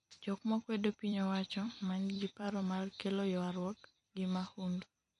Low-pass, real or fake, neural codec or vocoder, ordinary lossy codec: 14.4 kHz; fake; vocoder, 44.1 kHz, 128 mel bands every 256 samples, BigVGAN v2; MP3, 48 kbps